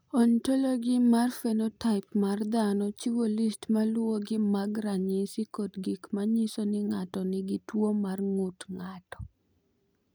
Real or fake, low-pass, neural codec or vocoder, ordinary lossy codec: real; none; none; none